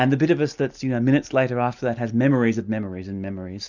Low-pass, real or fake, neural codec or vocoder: 7.2 kHz; real; none